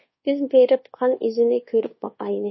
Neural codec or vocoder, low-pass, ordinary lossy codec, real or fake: codec, 24 kHz, 1.2 kbps, DualCodec; 7.2 kHz; MP3, 24 kbps; fake